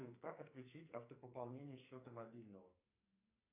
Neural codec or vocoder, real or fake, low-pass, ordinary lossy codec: codec, 44.1 kHz, 2.6 kbps, SNAC; fake; 3.6 kHz; AAC, 32 kbps